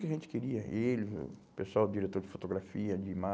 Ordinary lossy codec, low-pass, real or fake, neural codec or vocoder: none; none; real; none